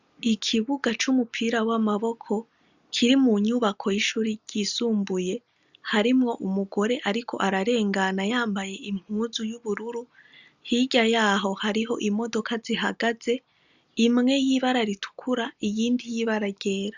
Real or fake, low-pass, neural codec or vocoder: real; 7.2 kHz; none